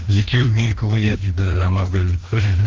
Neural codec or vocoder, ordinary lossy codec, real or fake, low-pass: codec, 16 kHz, 1 kbps, FreqCodec, larger model; Opus, 16 kbps; fake; 7.2 kHz